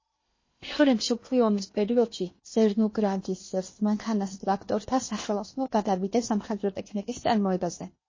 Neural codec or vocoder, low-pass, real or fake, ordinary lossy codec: codec, 16 kHz in and 24 kHz out, 0.8 kbps, FocalCodec, streaming, 65536 codes; 7.2 kHz; fake; MP3, 32 kbps